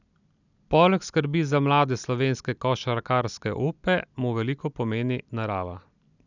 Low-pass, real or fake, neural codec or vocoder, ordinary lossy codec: 7.2 kHz; real; none; none